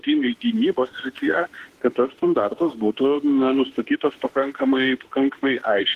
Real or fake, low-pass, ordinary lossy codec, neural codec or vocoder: fake; 14.4 kHz; Opus, 16 kbps; autoencoder, 48 kHz, 32 numbers a frame, DAC-VAE, trained on Japanese speech